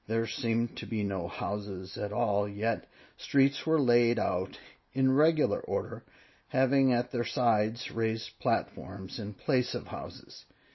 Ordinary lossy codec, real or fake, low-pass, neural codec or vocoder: MP3, 24 kbps; real; 7.2 kHz; none